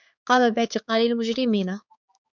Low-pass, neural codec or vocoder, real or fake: 7.2 kHz; codec, 16 kHz, 4 kbps, X-Codec, HuBERT features, trained on balanced general audio; fake